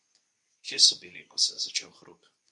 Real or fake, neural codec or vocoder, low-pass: fake; codec, 24 kHz, 0.9 kbps, WavTokenizer, medium speech release version 2; 10.8 kHz